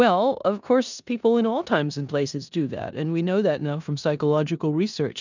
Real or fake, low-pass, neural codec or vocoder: fake; 7.2 kHz; codec, 16 kHz in and 24 kHz out, 0.9 kbps, LongCat-Audio-Codec, four codebook decoder